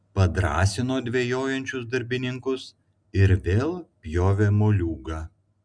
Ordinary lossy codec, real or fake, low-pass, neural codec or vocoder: Opus, 64 kbps; real; 9.9 kHz; none